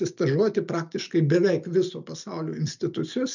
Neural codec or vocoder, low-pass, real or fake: none; 7.2 kHz; real